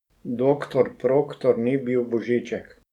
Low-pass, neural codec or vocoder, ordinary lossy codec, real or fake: 19.8 kHz; codec, 44.1 kHz, 7.8 kbps, DAC; none; fake